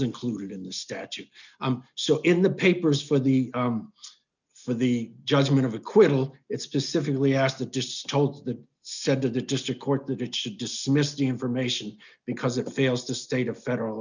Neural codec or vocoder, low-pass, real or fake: none; 7.2 kHz; real